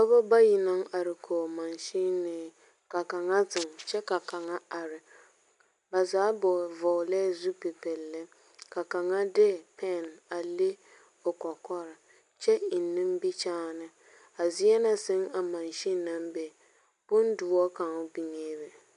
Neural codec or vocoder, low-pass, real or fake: none; 10.8 kHz; real